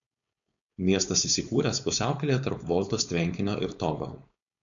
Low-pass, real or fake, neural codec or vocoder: 7.2 kHz; fake; codec, 16 kHz, 4.8 kbps, FACodec